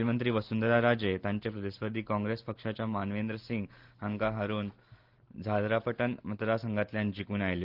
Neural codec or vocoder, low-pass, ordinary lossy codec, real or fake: none; 5.4 kHz; Opus, 16 kbps; real